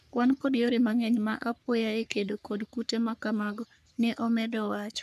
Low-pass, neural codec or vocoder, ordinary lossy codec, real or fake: 14.4 kHz; codec, 44.1 kHz, 3.4 kbps, Pupu-Codec; none; fake